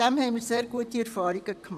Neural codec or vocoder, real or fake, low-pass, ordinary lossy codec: vocoder, 44.1 kHz, 128 mel bands, Pupu-Vocoder; fake; 14.4 kHz; none